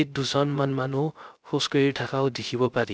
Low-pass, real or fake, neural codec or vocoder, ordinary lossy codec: none; fake; codec, 16 kHz, 0.3 kbps, FocalCodec; none